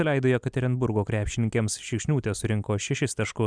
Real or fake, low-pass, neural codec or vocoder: real; 9.9 kHz; none